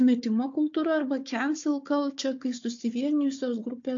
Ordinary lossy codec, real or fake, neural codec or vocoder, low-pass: AAC, 48 kbps; fake; codec, 16 kHz, 4 kbps, FunCodec, trained on Chinese and English, 50 frames a second; 7.2 kHz